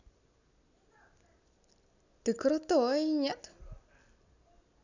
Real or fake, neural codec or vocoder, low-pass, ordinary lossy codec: real; none; 7.2 kHz; none